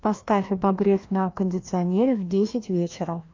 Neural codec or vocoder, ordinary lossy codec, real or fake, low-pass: codec, 16 kHz, 1 kbps, FreqCodec, larger model; MP3, 48 kbps; fake; 7.2 kHz